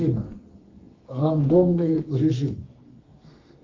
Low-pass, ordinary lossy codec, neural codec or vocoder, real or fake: 7.2 kHz; Opus, 32 kbps; codec, 32 kHz, 1.9 kbps, SNAC; fake